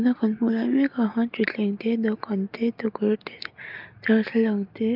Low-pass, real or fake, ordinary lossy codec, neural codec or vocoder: 5.4 kHz; real; Opus, 24 kbps; none